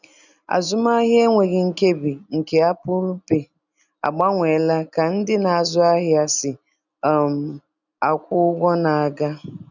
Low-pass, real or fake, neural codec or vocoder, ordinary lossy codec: 7.2 kHz; real; none; none